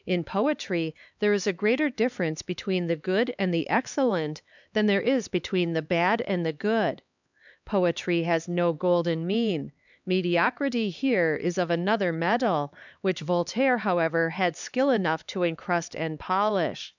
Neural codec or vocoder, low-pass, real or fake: codec, 16 kHz, 4 kbps, X-Codec, HuBERT features, trained on LibriSpeech; 7.2 kHz; fake